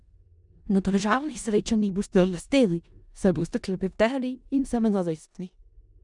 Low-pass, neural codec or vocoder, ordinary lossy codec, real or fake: 10.8 kHz; codec, 16 kHz in and 24 kHz out, 0.4 kbps, LongCat-Audio-Codec, four codebook decoder; none; fake